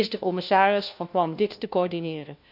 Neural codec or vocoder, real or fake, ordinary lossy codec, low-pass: codec, 16 kHz, 1 kbps, FunCodec, trained on LibriTTS, 50 frames a second; fake; none; 5.4 kHz